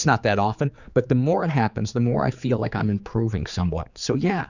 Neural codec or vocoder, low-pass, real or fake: codec, 16 kHz, 4 kbps, X-Codec, HuBERT features, trained on general audio; 7.2 kHz; fake